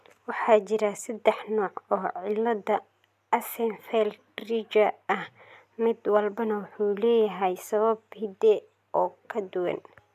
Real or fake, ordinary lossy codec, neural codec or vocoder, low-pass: real; MP3, 96 kbps; none; 14.4 kHz